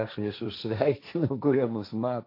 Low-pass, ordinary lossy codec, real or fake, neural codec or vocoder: 5.4 kHz; AAC, 32 kbps; fake; codec, 16 kHz, 1.1 kbps, Voila-Tokenizer